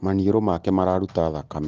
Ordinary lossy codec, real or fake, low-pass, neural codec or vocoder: Opus, 16 kbps; real; 7.2 kHz; none